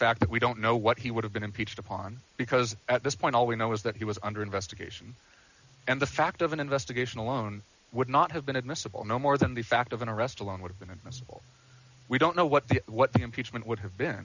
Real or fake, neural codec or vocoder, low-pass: real; none; 7.2 kHz